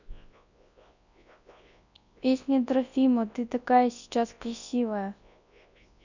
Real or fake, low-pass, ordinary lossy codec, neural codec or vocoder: fake; 7.2 kHz; none; codec, 24 kHz, 0.9 kbps, WavTokenizer, large speech release